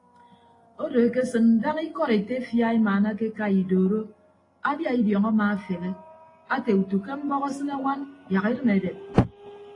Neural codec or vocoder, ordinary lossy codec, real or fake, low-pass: none; AAC, 32 kbps; real; 10.8 kHz